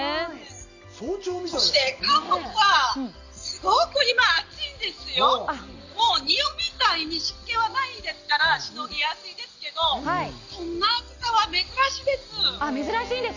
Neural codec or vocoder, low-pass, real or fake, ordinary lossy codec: none; 7.2 kHz; real; none